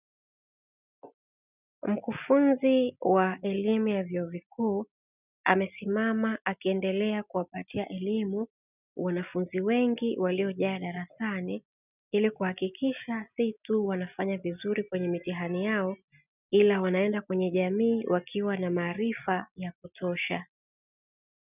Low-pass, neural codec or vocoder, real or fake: 3.6 kHz; none; real